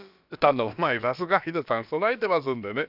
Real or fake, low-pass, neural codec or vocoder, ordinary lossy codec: fake; 5.4 kHz; codec, 16 kHz, about 1 kbps, DyCAST, with the encoder's durations; none